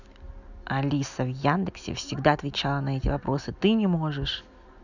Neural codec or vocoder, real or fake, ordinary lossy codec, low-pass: none; real; none; 7.2 kHz